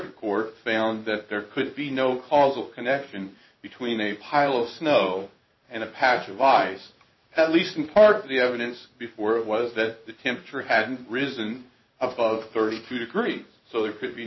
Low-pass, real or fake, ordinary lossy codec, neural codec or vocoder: 7.2 kHz; fake; MP3, 24 kbps; codec, 16 kHz in and 24 kHz out, 1 kbps, XY-Tokenizer